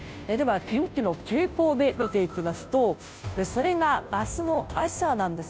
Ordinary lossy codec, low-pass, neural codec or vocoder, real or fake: none; none; codec, 16 kHz, 0.5 kbps, FunCodec, trained on Chinese and English, 25 frames a second; fake